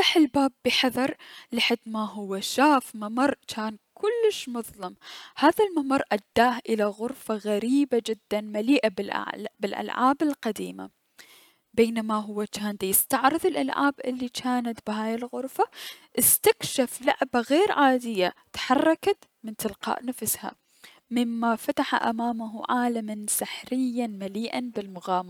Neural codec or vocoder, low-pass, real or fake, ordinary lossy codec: none; 19.8 kHz; real; none